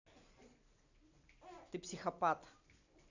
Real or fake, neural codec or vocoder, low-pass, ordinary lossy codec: real; none; 7.2 kHz; none